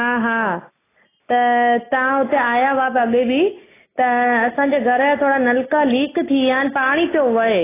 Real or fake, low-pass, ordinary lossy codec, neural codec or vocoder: real; 3.6 kHz; AAC, 16 kbps; none